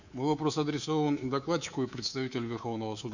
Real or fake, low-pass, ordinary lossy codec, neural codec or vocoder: fake; 7.2 kHz; none; codec, 24 kHz, 3.1 kbps, DualCodec